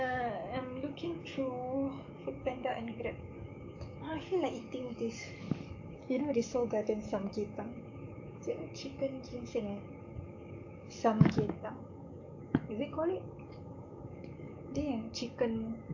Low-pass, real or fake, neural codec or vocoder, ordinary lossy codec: 7.2 kHz; fake; codec, 44.1 kHz, 7.8 kbps, DAC; AAC, 48 kbps